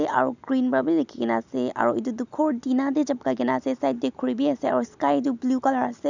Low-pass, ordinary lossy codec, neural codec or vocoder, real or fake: 7.2 kHz; none; none; real